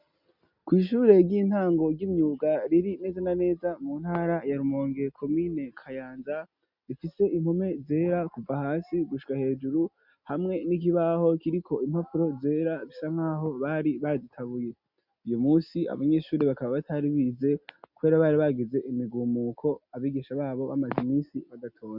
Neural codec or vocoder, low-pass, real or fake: none; 5.4 kHz; real